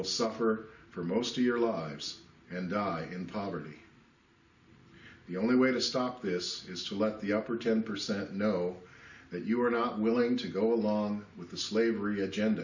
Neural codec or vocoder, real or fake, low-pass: none; real; 7.2 kHz